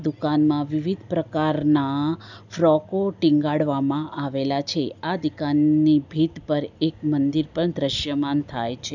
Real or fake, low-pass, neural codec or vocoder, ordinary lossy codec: real; 7.2 kHz; none; none